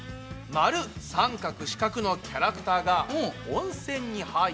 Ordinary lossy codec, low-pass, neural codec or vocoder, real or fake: none; none; none; real